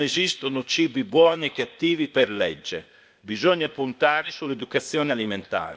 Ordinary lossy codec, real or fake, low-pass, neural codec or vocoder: none; fake; none; codec, 16 kHz, 0.8 kbps, ZipCodec